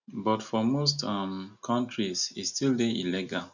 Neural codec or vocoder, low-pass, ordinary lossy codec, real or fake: none; 7.2 kHz; none; real